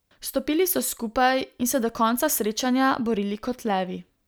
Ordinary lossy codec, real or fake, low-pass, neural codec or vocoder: none; real; none; none